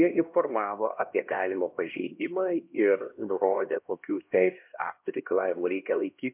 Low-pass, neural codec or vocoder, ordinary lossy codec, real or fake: 3.6 kHz; codec, 16 kHz, 1 kbps, X-Codec, HuBERT features, trained on LibriSpeech; MP3, 32 kbps; fake